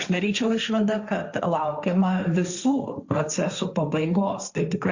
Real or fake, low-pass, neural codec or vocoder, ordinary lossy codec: fake; 7.2 kHz; codec, 16 kHz, 1.1 kbps, Voila-Tokenizer; Opus, 64 kbps